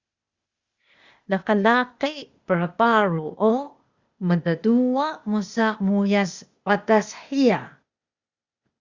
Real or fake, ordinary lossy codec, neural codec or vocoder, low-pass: fake; Opus, 64 kbps; codec, 16 kHz, 0.8 kbps, ZipCodec; 7.2 kHz